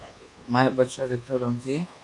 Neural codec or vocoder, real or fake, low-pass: codec, 24 kHz, 1.2 kbps, DualCodec; fake; 10.8 kHz